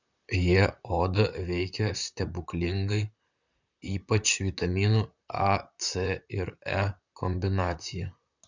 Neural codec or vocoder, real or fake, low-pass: vocoder, 44.1 kHz, 128 mel bands, Pupu-Vocoder; fake; 7.2 kHz